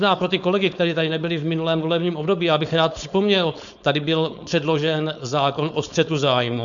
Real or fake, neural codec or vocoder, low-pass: fake; codec, 16 kHz, 4.8 kbps, FACodec; 7.2 kHz